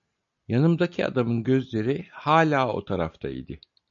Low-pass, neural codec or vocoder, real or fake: 7.2 kHz; none; real